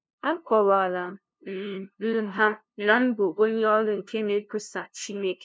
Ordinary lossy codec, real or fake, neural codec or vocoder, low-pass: none; fake; codec, 16 kHz, 0.5 kbps, FunCodec, trained on LibriTTS, 25 frames a second; none